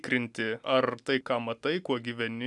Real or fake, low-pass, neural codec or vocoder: real; 10.8 kHz; none